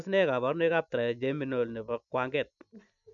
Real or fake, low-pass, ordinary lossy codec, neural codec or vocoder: real; 7.2 kHz; none; none